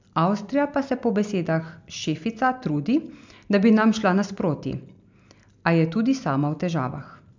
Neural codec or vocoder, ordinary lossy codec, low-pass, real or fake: none; MP3, 64 kbps; 7.2 kHz; real